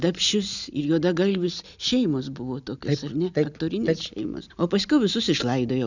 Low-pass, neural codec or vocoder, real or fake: 7.2 kHz; none; real